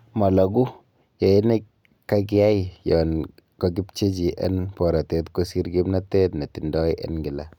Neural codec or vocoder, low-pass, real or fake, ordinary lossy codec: none; 19.8 kHz; real; none